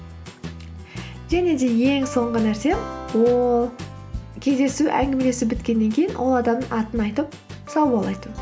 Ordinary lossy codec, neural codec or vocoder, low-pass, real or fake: none; none; none; real